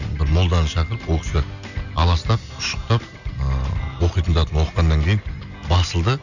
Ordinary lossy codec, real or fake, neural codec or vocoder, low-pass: none; real; none; 7.2 kHz